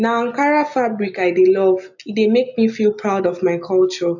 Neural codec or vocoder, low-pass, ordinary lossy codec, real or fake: none; 7.2 kHz; none; real